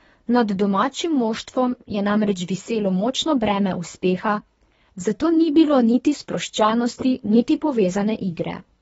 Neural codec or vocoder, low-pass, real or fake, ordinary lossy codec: codec, 24 kHz, 3 kbps, HILCodec; 10.8 kHz; fake; AAC, 24 kbps